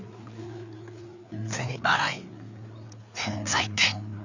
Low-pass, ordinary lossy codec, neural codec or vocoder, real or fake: 7.2 kHz; Opus, 64 kbps; codec, 16 kHz, 4 kbps, FreqCodec, larger model; fake